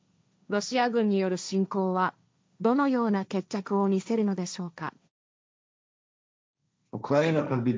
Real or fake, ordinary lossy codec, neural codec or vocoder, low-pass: fake; none; codec, 16 kHz, 1.1 kbps, Voila-Tokenizer; none